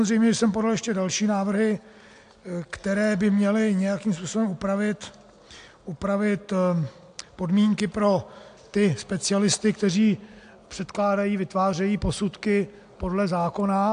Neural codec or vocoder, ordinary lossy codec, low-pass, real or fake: none; AAC, 64 kbps; 9.9 kHz; real